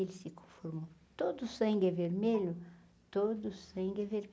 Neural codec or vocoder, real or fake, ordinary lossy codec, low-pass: none; real; none; none